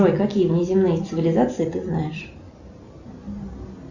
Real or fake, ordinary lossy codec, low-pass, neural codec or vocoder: real; Opus, 64 kbps; 7.2 kHz; none